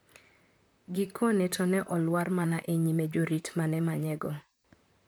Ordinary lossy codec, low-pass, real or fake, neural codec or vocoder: none; none; fake; vocoder, 44.1 kHz, 128 mel bands, Pupu-Vocoder